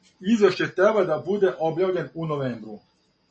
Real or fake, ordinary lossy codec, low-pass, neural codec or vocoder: real; MP3, 32 kbps; 9.9 kHz; none